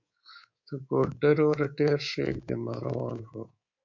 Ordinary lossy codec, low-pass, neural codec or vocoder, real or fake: MP3, 48 kbps; 7.2 kHz; codec, 24 kHz, 3.1 kbps, DualCodec; fake